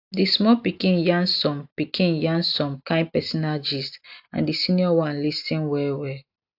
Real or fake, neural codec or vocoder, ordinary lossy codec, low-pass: real; none; AAC, 48 kbps; 5.4 kHz